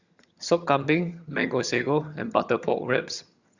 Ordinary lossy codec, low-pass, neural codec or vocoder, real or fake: Opus, 64 kbps; 7.2 kHz; vocoder, 22.05 kHz, 80 mel bands, HiFi-GAN; fake